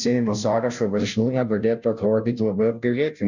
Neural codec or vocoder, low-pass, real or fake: codec, 16 kHz, 0.5 kbps, FunCodec, trained on Chinese and English, 25 frames a second; 7.2 kHz; fake